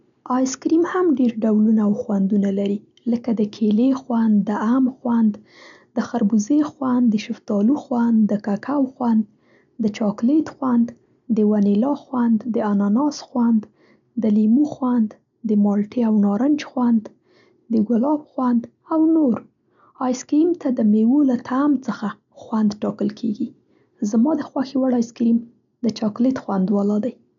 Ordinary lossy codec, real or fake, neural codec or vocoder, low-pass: none; real; none; 7.2 kHz